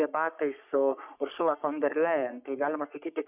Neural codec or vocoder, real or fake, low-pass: codec, 44.1 kHz, 3.4 kbps, Pupu-Codec; fake; 3.6 kHz